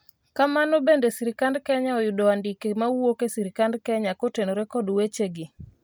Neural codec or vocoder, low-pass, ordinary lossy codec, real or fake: none; none; none; real